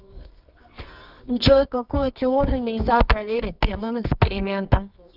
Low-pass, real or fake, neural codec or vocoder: 5.4 kHz; fake; codec, 24 kHz, 0.9 kbps, WavTokenizer, medium music audio release